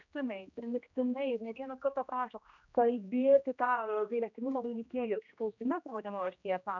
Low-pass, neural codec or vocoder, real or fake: 7.2 kHz; codec, 16 kHz, 1 kbps, X-Codec, HuBERT features, trained on general audio; fake